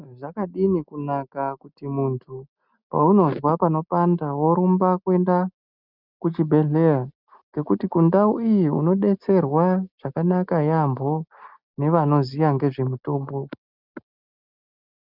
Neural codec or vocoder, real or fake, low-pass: none; real; 5.4 kHz